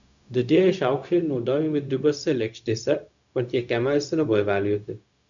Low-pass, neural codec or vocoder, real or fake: 7.2 kHz; codec, 16 kHz, 0.4 kbps, LongCat-Audio-Codec; fake